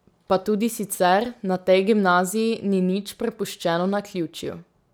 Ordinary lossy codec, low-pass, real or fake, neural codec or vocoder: none; none; real; none